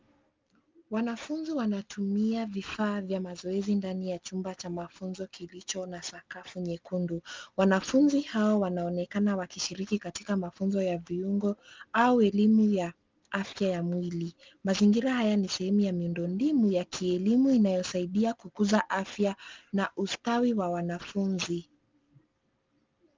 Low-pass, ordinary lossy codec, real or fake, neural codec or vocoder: 7.2 kHz; Opus, 24 kbps; real; none